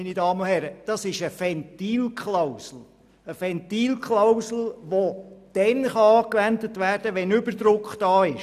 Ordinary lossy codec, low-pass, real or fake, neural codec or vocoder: none; 14.4 kHz; real; none